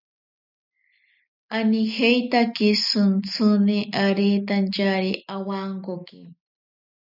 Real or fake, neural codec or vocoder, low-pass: real; none; 5.4 kHz